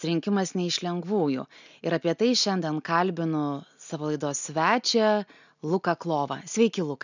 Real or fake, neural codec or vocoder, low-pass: real; none; 7.2 kHz